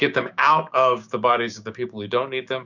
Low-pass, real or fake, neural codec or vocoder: 7.2 kHz; fake; codec, 16 kHz, 6 kbps, DAC